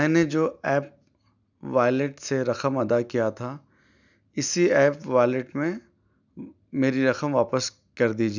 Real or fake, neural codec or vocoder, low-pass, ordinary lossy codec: real; none; 7.2 kHz; none